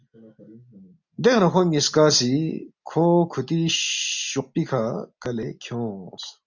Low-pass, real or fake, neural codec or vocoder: 7.2 kHz; real; none